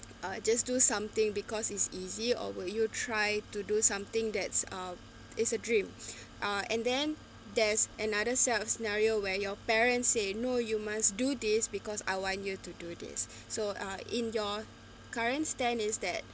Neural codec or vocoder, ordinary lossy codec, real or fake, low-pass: none; none; real; none